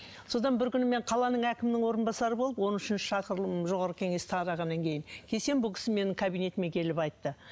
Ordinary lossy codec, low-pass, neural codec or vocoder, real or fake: none; none; none; real